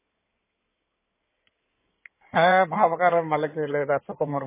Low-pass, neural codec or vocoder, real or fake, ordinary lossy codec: 3.6 kHz; codec, 16 kHz in and 24 kHz out, 2.2 kbps, FireRedTTS-2 codec; fake; MP3, 16 kbps